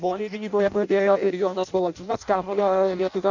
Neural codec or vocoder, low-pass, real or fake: codec, 16 kHz in and 24 kHz out, 0.6 kbps, FireRedTTS-2 codec; 7.2 kHz; fake